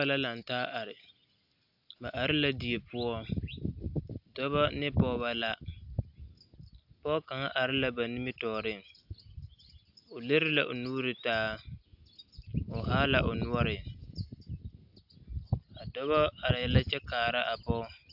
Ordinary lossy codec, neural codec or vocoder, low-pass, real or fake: AAC, 48 kbps; none; 5.4 kHz; real